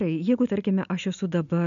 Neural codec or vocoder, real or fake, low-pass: none; real; 7.2 kHz